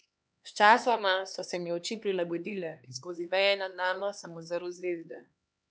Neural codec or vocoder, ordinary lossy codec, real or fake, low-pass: codec, 16 kHz, 2 kbps, X-Codec, HuBERT features, trained on LibriSpeech; none; fake; none